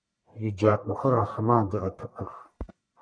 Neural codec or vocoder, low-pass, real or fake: codec, 44.1 kHz, 1.7 kbps, Pupu-Codec; 9.9 kHz; fake